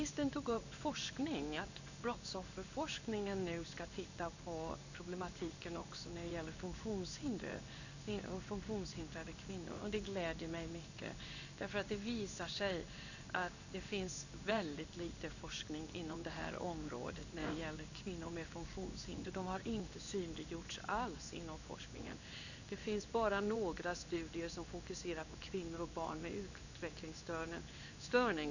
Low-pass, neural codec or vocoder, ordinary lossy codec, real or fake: 7.2 kHz; codec, 16 kHz in and 24 kHz out, 1 kbps, XY-Tokenizer; none; fake